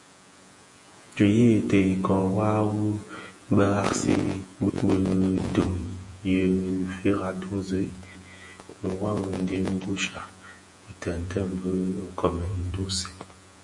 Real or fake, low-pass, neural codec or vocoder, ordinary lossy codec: fake; 10.8 kHz; vocoder, 48 kHz, 128 mel bands, Vocos; MP3, 48 kbps